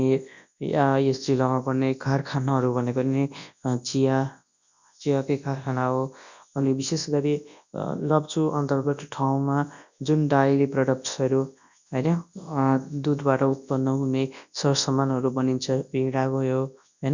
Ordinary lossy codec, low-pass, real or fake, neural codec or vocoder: none; 7.2 kHz; fake; codec, 24 kHz, 0.9 kbps, WavTokenizer, large speech release